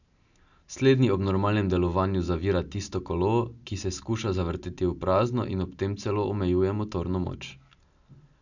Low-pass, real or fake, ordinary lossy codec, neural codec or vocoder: 7.2 kHz; real; none; none